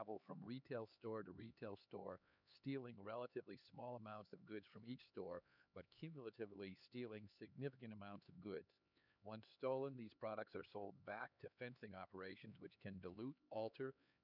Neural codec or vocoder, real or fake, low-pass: codec, 16 kHz, 4 kbps, X-Codec, HuBERT features, trained on LibriSpeech; fake; 5.4 kHz